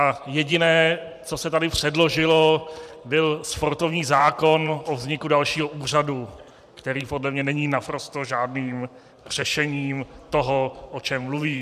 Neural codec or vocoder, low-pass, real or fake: vocoder, 44.1 kHz, 128 mel bands every 512 samples, BigVGAN v2; 14.4 kHz; fake